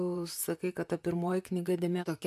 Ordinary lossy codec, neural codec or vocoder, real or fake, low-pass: MP3, 96 kbps; vocoder, 44.1 kHz, 128 mel bands, Pupu-Vocoder; fake; 14.4 kHz